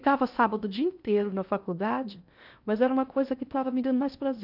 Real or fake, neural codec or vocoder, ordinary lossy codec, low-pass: fake; codec, 16 kHz in and 24 kHz out, 0.8 kbps, FocalCodec, streaming, 65536 codes; none; 5.4 kHz